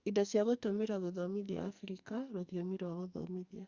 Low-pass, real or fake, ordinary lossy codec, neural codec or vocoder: 7.2 kHz; fake; Opus, 64 kbps; codec, 44.1 kHz, 2.6 kbps, SNAC